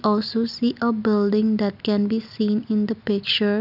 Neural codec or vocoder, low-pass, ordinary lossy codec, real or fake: none; 5.4 kHz; none; real